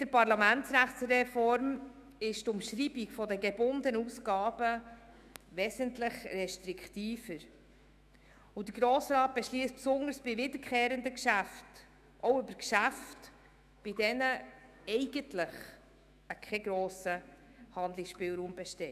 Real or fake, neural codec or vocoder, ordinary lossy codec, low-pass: fake; autoencoder, 48 kHz, 128 numbers a frame, DAC-VAE, trained on Japanese speech; none; 14.4 kHz